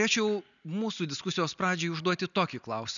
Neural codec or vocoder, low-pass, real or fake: none; 7.2 kHz; real